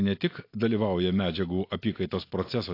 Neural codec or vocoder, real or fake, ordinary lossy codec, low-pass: none; real; AAC, 32 kbps; 5.4 kHz